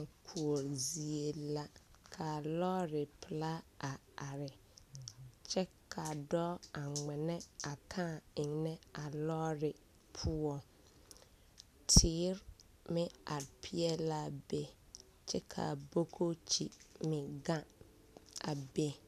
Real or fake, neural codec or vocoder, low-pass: real; none; 14.4 kHz